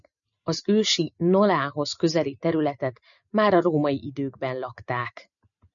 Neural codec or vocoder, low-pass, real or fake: none; 7.2 kHz; real